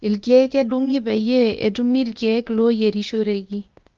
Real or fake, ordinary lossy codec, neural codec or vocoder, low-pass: fake; Opus, 24 kbps; codec, 16 kHz, 0.8 kbps, ZipCodec; 7.2 kHz